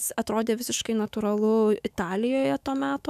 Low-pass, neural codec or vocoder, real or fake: 14.4 kHz; autoencoder, 48 kHz, 128 numbers a frame, DAC-VAE, trained on Japanese speech; fake